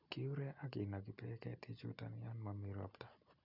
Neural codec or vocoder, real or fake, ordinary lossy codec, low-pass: none; real; none; 5.4 kHz